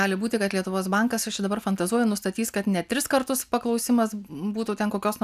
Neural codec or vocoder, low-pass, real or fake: none; 14.4 kHz; real